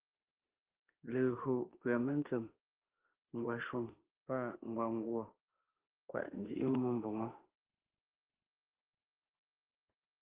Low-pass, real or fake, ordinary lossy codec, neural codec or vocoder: 3.6 kHz; fake; Opus, 16 kbps; autoencoder, 48 kHz, 32 numbers a frame, DAC-VAE, trained on Japanese speech